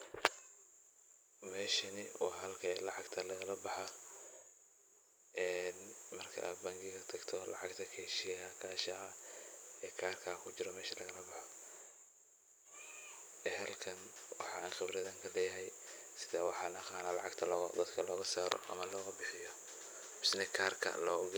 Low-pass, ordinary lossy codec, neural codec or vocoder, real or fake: none; none; vocoder, 44.1 kHz, 128 mel bands every 256 samples, BigVGAN v2; fake